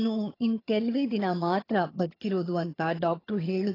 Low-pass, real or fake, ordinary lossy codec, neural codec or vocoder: 5.4 kHz; fake; AAC, 24 kbps; vocoder, 22.05 kHz, 80 mel bands, HiFi-GAN